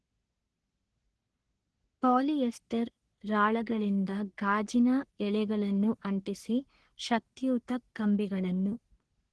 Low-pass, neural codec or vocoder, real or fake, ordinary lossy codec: 10.8 kHz; codec, 44.1 kHz, 3.4 kbps, Pupu-Codec; fake; Opus, 16 kbps